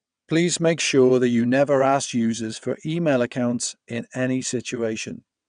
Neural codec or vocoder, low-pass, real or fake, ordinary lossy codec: vocoder, 22.05 kHz, 80 mel bands, WaveNeXt; 9.9 kHz; fake; none